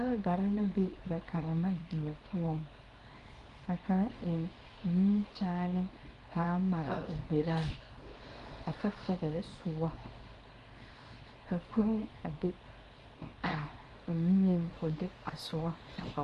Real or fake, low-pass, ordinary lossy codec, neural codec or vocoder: fake; 10.8 kHz; Opus, 32 kbps; codec, 24 kHz, 0.9 kbps, WavTokenizer, small release